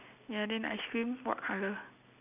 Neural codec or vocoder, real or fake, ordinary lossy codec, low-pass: none; real; none; 3.6 kHz